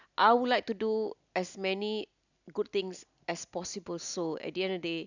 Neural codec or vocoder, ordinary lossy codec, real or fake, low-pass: none; none; real; 7.2 kHz